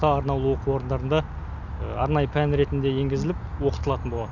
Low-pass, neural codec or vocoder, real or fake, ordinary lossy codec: 7.2 kHz; none; real; none